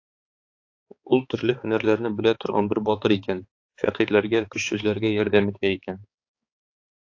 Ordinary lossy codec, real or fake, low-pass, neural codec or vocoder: AAC, 48 kbps; fake; 7.2 kHz; codec, 16 kHz, 4 kbps, X-Codec, HuBERT features, trained on balanced general audio